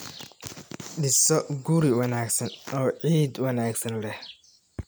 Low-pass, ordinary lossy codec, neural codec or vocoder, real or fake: none; none; none; real